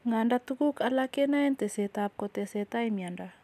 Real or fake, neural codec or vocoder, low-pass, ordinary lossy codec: real; none; 14.4 kHz; none